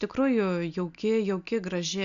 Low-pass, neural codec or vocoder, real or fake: 7.2 kHz; none; real